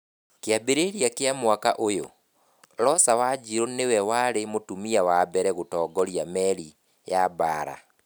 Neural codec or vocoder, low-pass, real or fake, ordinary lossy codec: none; none; real; none